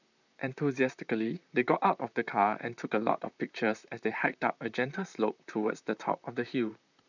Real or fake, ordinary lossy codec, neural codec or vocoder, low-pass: fake; none; vocoder, 44.1 kHz, 128 mel bands, Pupu-Vocoder; 7.2 kHz